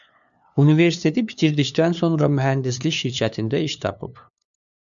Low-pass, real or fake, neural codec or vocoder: 7.2 kHz; fake; codec, 16 kHz, 2 kbps, FunCodec, trained on LibriTTS, 25 frames a second